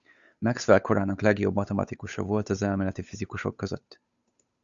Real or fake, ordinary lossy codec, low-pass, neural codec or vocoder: fake; Opus, 64 kbps; 7.2 kHz; codec, 16 kHz, 8 kbps, FunCodec, trained on Chinese and English, 25 frames a second